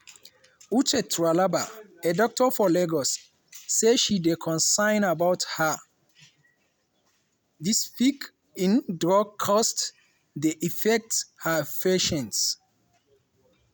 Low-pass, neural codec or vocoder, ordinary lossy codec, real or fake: none; none; none; real